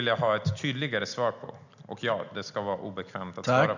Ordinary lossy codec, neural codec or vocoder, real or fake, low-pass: none; none; real; 7.2 kHz